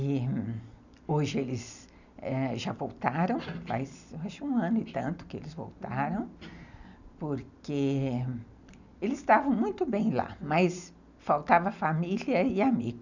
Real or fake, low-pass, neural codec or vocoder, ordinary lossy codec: real; 7.2 kHz; none; none